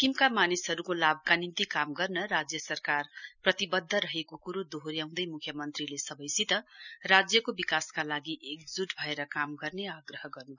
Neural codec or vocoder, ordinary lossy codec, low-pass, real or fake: none; none; 7.2 kHz; real